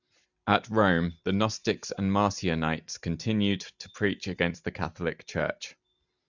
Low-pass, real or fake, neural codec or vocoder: 7.2 kHz; real; none